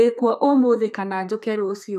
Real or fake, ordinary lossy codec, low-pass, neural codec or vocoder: fake; none; 14.4 kHz; codec, 32 kHz, 1.9 kbps, SNAC